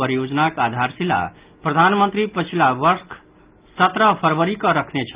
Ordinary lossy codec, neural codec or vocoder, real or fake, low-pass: Opus, 32 kbps; none; real; 3.6 kHz